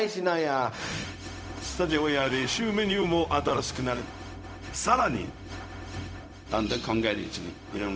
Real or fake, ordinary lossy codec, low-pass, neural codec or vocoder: fake; none; none; codec, 16 kHz, 0.4 kbps, LongCat-Audio-Codec